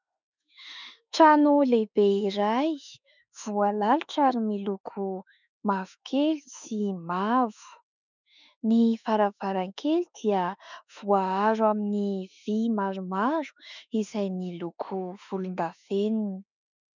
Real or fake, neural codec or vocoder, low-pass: fake; autoencoder, 48 kHz, 32 numbers a frame, DAC-VAE, trained on Japanese speech; 7.2 kHz